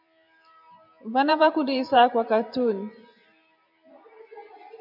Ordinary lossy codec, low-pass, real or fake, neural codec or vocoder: MP3, 48 kbps; 5.4 kHz; fake; vocoder, 44.1 kHz, 128 mel bands every 512 samples, BigVGAN v2